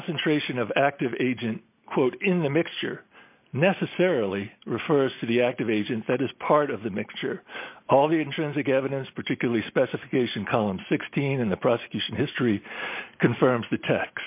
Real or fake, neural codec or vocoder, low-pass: real; none; 3.6 kHz